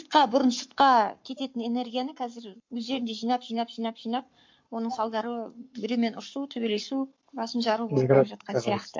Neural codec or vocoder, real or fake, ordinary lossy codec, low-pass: codec, 44.1 kHz, 7.8 kbps, Pupu-Codec; fake; MP3, 48 kbps; 7.2 kHz